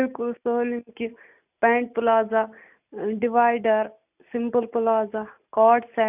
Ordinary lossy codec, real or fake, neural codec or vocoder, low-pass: none; real; none; 3.6 kHz